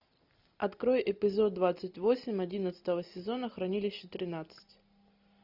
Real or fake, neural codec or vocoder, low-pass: real; none; 5.4 kHz